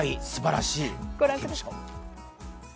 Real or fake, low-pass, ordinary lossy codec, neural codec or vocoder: real; none; none; none